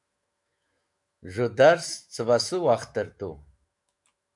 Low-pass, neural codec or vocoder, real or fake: 10.8 kHz; autoencoder, 48 kHz, 128 numbers a frame, DAC-VAE, trained on Japanese speech; fake